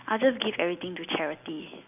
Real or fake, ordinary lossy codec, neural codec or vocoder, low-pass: real; none; none; 3.6 kHz